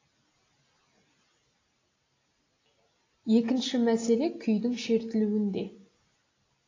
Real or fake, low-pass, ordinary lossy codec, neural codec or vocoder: real; 7.2 kHz; AAC, 32 kbps; none